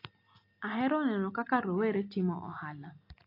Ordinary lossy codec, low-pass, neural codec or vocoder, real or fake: AAC, 32 kbps; 5.4 kHz; none; real